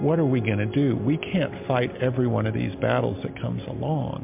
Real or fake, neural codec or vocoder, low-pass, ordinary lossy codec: real; none; 3.6 kHz; MP3, 32 kbps